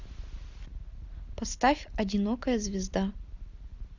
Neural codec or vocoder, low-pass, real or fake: none; 7.2 kHz; real